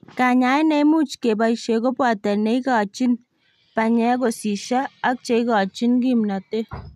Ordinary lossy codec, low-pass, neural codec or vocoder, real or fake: none; 14.4 kHz; none; real